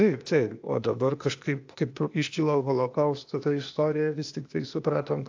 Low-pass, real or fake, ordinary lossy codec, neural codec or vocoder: 7.2 kHz; fake; AAC, 48 kbps; codec, 16 kHz, 0.8 kbps, ZipCodec